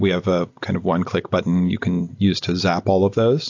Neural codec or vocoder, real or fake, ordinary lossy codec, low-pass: none; real; AAC, 48 kbps; 7.2 kHz